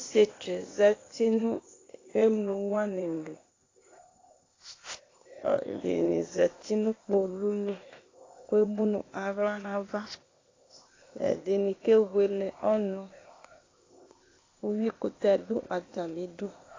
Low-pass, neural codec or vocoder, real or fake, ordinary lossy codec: 7.2 kHz; codec, 16 kHz, 0.8 kbps, ZipCodec; fake; AAC, 32 kbps